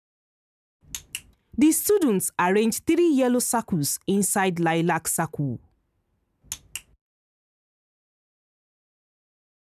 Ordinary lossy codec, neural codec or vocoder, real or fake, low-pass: none; none; real; 14.4 kHz